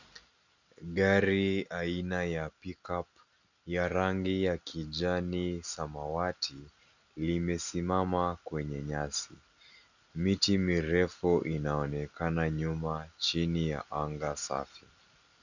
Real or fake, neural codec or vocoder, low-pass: real; none; 7.2 kHz